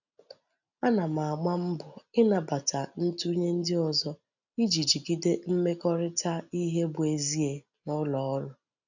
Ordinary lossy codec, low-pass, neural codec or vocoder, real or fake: none; 7.2 kHz; none; real